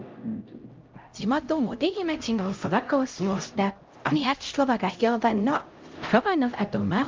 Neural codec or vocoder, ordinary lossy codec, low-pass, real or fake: codec, 16 kHz, 0.5 kbps, X-Codec, HuBERT features, trained on LibriSpeech; Opus, 24 kbps; 7.2 kHz; fake